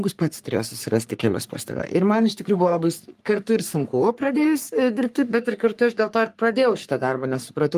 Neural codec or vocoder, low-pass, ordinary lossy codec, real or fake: codec, 44.1 kHz, 3.4 kbps, Pupu-Codec; 14.4 kHz; Opus, 32 kbps; fake